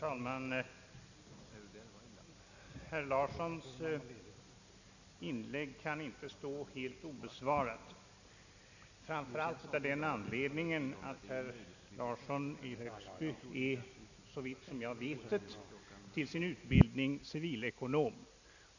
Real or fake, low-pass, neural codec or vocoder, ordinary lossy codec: real; 7.2 kHz; none; none